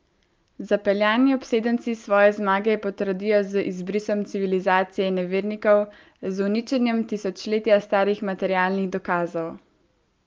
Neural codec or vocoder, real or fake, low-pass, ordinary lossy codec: none; real; 7.2 kHz; Opus, 24 kbps